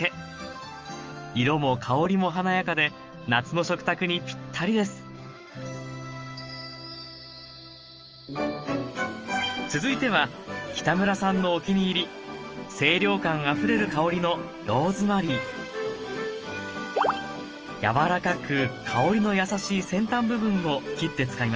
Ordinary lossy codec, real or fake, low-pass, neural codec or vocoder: Opus, 24 kbps; fake; 7.2 kHz; autoencoder, 48 kHz, 128 numbers a frame, DAC-VAE, trained on Japanese speech